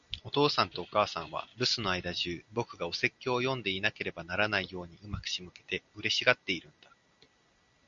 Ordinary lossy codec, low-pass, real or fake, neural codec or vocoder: Opus, 64 kbps; 7.2 kHz; real; none